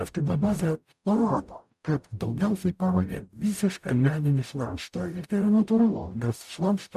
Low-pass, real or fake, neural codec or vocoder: 14.4 kHz; fake; codec, 44.1 kHz, 0.9 kbps, DAC